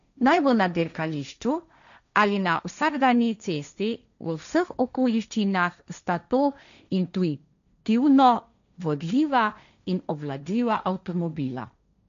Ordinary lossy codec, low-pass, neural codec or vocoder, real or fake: none; 7.2 kHz; codec, 16 kHz, 1.1 kbps, Voila-Tokenizer; fake